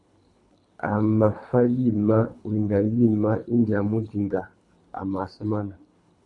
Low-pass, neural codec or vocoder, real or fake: 10.8 kHz; codec, 24 kHz, 3 kbps, HILCodec; fake